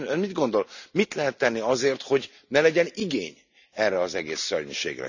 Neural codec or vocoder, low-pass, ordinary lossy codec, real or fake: none; 7.2 kHz; none; real